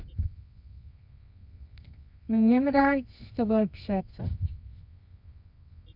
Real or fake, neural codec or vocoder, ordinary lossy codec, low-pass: fake; codec, 24 kHz, 0.9 kbps, WavTokenizer, medium music audio release; none; 5.4 kHz